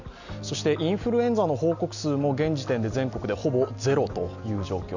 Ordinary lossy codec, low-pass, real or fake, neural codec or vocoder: none; 7.2 kHz; real; none